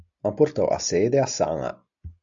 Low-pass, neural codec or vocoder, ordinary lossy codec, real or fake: 7.2 kHz; none; AAC, 64 kbps; real